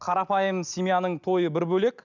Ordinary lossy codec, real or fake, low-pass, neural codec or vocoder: none; real; 7.2 kHz; none